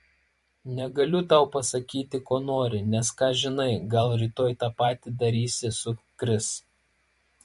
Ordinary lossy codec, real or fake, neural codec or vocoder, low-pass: MP3, 48 kbps; fake; vocoder, 44.1 kHz, 128 mel bands every 512 samples, BigVGAN v2; 14.4 kHz